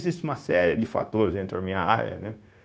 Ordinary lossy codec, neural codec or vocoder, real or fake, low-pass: none; codec, 16 kHz, 0.9 kbps, LongCat-Audio-Codec; fake; none